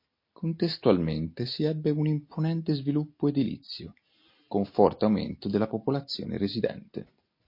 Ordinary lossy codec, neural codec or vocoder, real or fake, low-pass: MP3, 32 kbps; none; real; 5.4 kHz